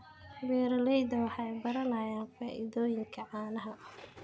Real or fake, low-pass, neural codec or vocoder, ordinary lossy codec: real; none; none; none